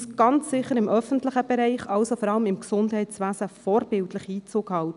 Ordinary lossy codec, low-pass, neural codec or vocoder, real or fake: none; 10.8 kHz; none; real